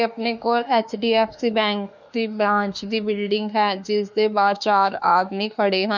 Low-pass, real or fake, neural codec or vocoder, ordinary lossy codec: 7.2 kHz; fake; autoencoder, 48 kHz, 32 numbers a frame, DAC-VAE, trained on Japanese speech; none